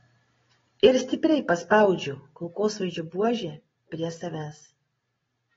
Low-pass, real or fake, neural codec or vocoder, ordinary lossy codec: 7.2 kHz; real; none; AAC, 24 kbps